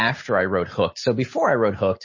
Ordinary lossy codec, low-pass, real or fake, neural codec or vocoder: MP3, 32 kbps; 7.2 kHz; real; none